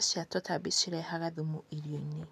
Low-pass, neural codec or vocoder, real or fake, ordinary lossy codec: 14.4 kHz; none; real; none